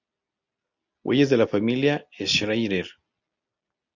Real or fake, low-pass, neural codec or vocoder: real; 7.2 kHz; none